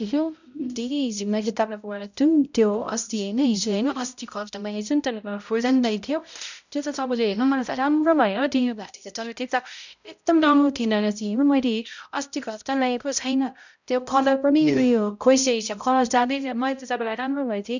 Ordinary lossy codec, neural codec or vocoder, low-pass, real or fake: none; codec, 16 kHz, 0.5 kbps, X-Codec, HuBERT features, trained on balanced general audio; 7.2 kHz; fake